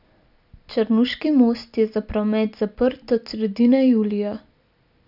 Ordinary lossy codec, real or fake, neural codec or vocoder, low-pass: none; real; none; 5.4 kHz